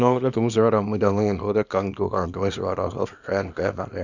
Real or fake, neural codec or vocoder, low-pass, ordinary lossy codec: fake; codec, 24 kHz, 0.9 kbps, WavTokenizer, small release; 7.2 kHz; none